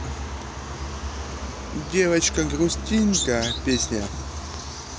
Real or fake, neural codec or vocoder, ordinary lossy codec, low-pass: real; none; none; none